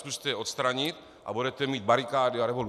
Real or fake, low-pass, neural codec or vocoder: real; 14.4 kHz; none